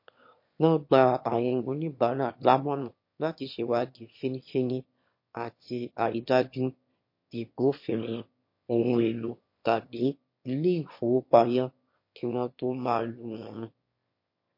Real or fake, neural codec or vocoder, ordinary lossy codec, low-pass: fake; autoencoder, 22.05 kHz, a latent of 192 numbers a frame, VITS, trained on one speaker; MP3, 32 kbps; 5.4 kHz